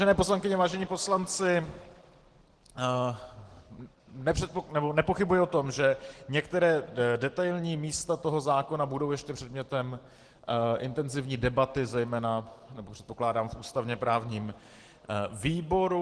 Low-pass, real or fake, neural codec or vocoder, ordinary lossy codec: 10.8 kHz; real; none; Opus, 16 kbps